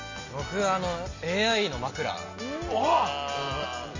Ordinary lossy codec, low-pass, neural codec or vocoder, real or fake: MP3, 32 kbps; 7.2 kHz; none; real